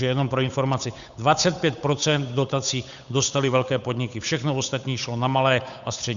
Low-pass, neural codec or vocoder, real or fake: 7.2 kHz; codec, 16 kHz, 8 kbps, FunCodec, trained on Chinese and English, 25 frames a second; fake